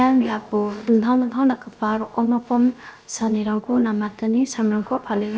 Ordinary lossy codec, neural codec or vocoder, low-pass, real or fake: none; codec, 16 kHz, about 1 kbps, DyCAST, with the encoder's durations; none; fake